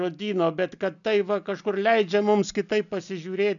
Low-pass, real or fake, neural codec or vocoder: 7.2 kHz; real; none